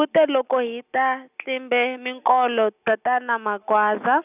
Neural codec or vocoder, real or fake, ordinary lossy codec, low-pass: none; real; none; 3.6 kHz